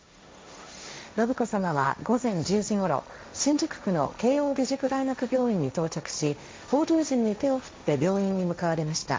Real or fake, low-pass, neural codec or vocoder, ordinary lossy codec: fake; none; codec, 16 kHz, 1.1 kbps, Voila-Tokenizer; none